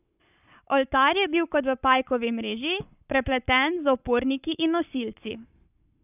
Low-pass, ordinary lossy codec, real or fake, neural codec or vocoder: 3.6 kHz; none; fake; codec, 44.1 kHz, 7.8 kbps, Pupu-Codec